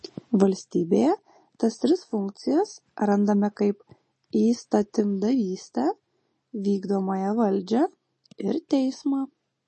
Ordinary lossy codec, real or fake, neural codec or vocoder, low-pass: MP3, 32 kbps; real; none; 10.8 kHz